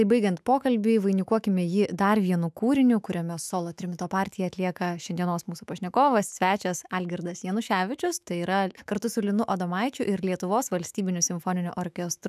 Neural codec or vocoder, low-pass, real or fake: autoencoder, 48 kHz, 128 numbers a frame, DAC-VAE, trained on Japanese speech; 14.4 kHz; fake